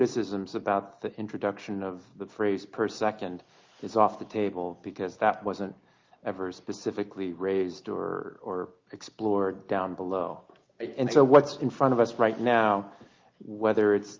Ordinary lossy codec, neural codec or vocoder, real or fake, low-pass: Opus, 32 kbps; none; real; 7.2 kHz